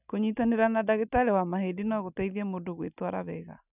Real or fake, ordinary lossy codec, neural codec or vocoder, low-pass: real; none; none; 3.6 kHz